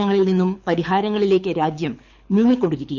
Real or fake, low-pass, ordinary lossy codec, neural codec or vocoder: fake; 7.2 kHz; none; codec, 24 kHz, 6 kbps, HILCodec